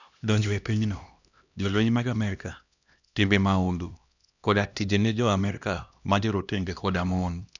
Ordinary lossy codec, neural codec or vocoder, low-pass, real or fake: none; codec, 16 kHz, 1 kbps, X-Codec, HuBERT features, trained on LibriSpeech; 7.2 kHz; fake